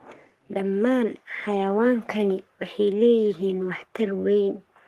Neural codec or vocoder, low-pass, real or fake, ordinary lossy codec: codec, 44.1 kHz, 3.4 kbps, Pupu-Codec; 14.4 kHz; fake; Opus, 24 kbps